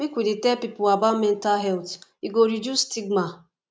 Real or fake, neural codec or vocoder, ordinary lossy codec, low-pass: real; none; none; none